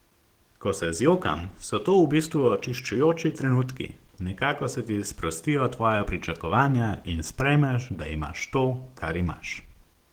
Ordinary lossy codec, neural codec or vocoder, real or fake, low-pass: Opus, 16 kbps; codec, 44.1 kHz, 7.8 kbps, DAC; fake; 19.8 kHz